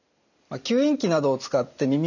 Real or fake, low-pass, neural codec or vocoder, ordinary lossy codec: real; 7.2 kHz; none; none